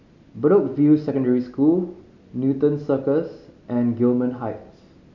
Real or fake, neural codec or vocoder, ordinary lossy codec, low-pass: real; none; none; 7.2 kHz